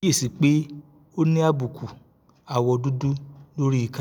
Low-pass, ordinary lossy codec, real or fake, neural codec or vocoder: none; none; real; none